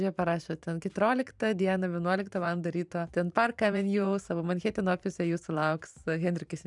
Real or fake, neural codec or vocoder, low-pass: fake; vocoder, 44.1 kHz, 128 mel bands every 512 samples, BigVGAN v2; 10.8 kHz